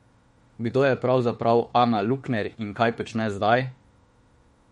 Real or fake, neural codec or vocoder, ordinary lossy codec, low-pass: fake; autoencoder, 48 kHz, 32 numbers a frame, DAC-VAE, trained on Japanese speech; MP3, 48 kbps; 19.8 kHz